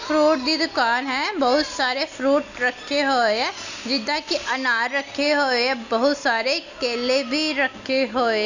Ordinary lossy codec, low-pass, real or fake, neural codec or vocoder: none; 7.2 kHz; real; none